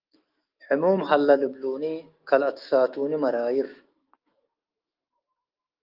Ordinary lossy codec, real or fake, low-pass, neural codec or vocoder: Opus, 32 kbps; fake; 5.4 kHz; autoencoder, 48 kHz, 128 numbers a frame, DAC-VAE, trained on Japanese speech